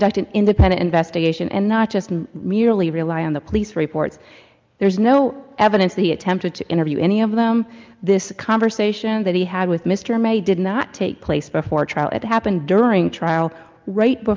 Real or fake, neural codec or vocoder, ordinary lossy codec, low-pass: real; none; Opus, 32 kbps; 7.2 kHz